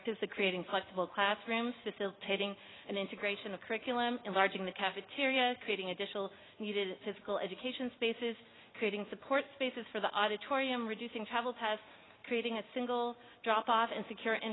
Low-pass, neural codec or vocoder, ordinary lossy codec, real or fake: 7.2 kHz; none; AAC, 16 kbps; real